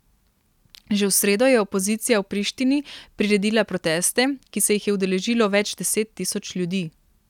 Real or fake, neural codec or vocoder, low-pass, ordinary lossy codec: real; none; 19.8 kHz; none